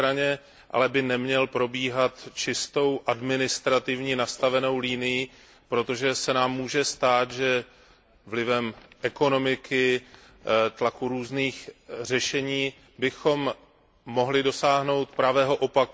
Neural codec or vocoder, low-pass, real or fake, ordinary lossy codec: none; none; real; none